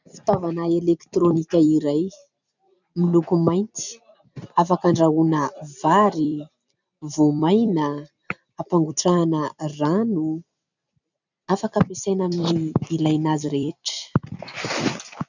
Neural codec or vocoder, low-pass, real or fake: vocoder, 44.1 kHz, 128 mel bands every 256 samples, BigVGAN v2; 7.2 kHz; fake